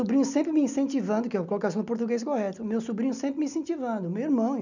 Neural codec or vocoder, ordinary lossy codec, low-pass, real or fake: none; none; 7.2 kHz; real